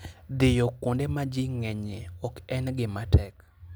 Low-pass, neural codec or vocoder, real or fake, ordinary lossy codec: none; none; real; none